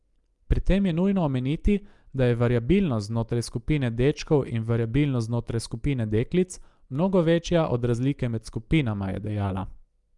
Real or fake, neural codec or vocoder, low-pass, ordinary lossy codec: real; none; 10.8 kHz; Opus, 32 kbps